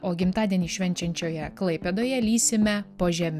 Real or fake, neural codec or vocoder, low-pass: real; none; 14.4 kHz